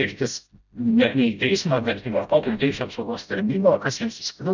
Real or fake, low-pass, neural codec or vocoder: fake; 7.2 kHz; codec, 16 kHz, 0.5 kbps, FreqCodec, smaller model